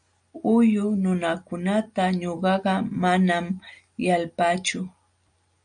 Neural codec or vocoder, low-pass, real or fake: none; 9.9 kHz; real